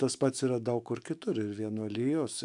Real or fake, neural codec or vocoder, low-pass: fake; autoencoder, 48 kHz, 128 numbers a frame, DAC-VAE, trained on Japanese speech; 10.8 kHz